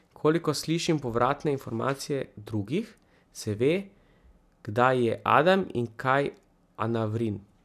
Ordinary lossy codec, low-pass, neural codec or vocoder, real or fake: none; 14.4 kHz; none; real